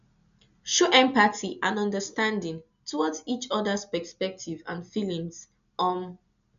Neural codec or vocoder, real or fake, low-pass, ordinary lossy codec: none; real; 7.2 kHz; none